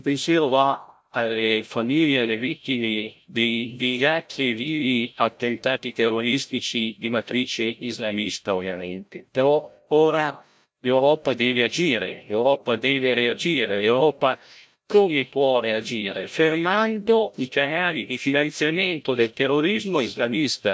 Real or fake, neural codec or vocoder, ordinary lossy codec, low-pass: fake; codec, 16 kHz, 0.5 kbps, FreqCodec, larger model; none; none